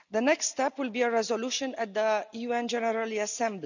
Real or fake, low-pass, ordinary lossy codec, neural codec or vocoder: real; 7.2 kHz; none; none